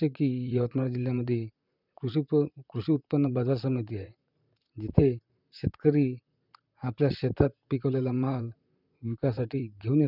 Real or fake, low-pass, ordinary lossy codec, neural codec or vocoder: fake; 5.4 kHz; none; vocoder, 44.1 kHz, 128 mel bands, Pupu-Vocoder